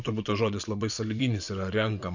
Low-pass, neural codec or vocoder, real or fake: 7.2 kHz; vocoder, 24 kHz, 100 mel bands, Vocos; fake